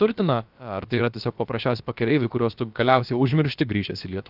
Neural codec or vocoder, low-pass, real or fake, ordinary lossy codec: codec, 16 kHz, about 1 kbps, DyCAST, with the encoder's durations; 5.4 kHz; fake; Opus, 24 kbps